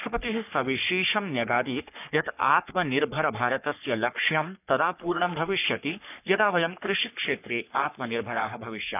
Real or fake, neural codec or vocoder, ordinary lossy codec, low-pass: fake; codec, 44.1 kHz, 3.4 kbps, Pupu-Codec; none; 3.6 kHz